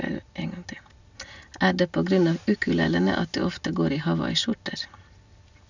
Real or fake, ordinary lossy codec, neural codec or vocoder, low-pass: real; none; none; 7.2 kHz